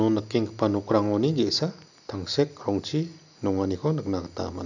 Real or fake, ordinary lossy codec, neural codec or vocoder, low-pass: real; none; none; 7.2 kHz